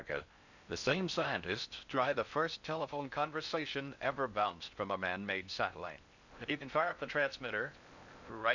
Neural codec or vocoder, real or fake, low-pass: codec, 16 kHz in and 24 kHz out, 0.6 kbps, FocalCodec, streaming, 4096 codes; fake; 7.2 kHz